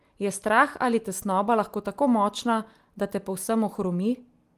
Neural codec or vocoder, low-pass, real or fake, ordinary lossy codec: none; 14.4 kHz; real; Opus, 24 kbps